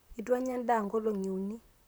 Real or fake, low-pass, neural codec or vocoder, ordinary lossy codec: fake; none; vocoder, 44.1 kHz, 128 mel bands, Pupu-Vocoder; none